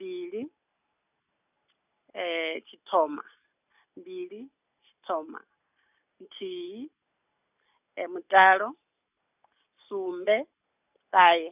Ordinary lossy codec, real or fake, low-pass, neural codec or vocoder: none; real; 3.6 kHz; none